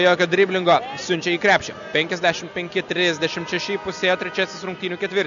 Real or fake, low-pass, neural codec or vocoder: real; 7.2 kHz; none